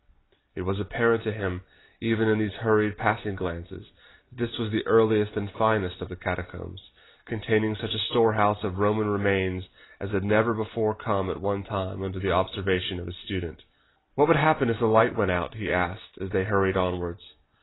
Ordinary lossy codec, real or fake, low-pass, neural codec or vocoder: AAC, 16 kbps; real; 7.2 kHz; none